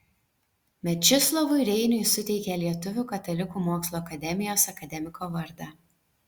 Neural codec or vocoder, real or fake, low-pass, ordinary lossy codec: none; real; 19.8 kHz; Opus, 64 kbps